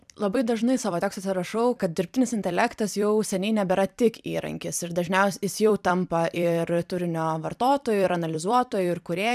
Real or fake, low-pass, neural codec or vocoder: fake; 14.4 kHz; vocoder, 44.1 kHz, 128 mel bands every 256 samples, BigVGAN v2